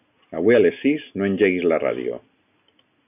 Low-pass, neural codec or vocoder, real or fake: 3.6 kHz; none; real